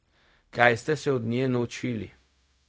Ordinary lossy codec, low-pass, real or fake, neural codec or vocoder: none; none; fake; codec, 16 kHz, 0.4 kbps, LongCat-Audio-Codec